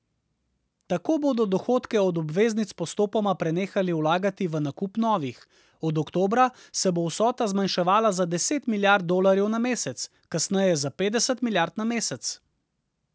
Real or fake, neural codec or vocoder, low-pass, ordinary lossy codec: real; none; none; none